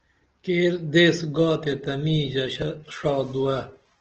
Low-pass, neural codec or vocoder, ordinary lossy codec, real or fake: 7.2 kHz; none; Opus, 16 kbps; real